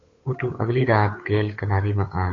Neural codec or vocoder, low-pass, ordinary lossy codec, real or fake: codec, 16 kHz, 8 kbps, FunCodec, trained on Chinese and English, 25 frames a second; 7.2 kHz; AAC, 32 kbps; fake